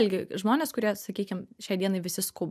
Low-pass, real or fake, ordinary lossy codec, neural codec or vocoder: 14.4 kHz; real; MP3, 96 kbps; none